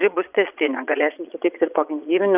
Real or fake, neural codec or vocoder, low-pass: fake; vocoder, 22.05 kHz, 80 mel bands, Vocos; 3.6 kHz